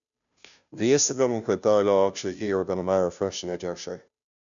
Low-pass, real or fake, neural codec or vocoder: 7.2 kHz; fake; codec, 16 kHz, 0.5 kbps, FunCodec, trained on Chinese and English, 25 frames a second